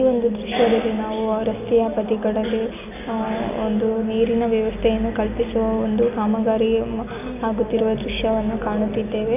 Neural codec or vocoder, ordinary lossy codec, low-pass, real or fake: none; none; 3.6 kHz; real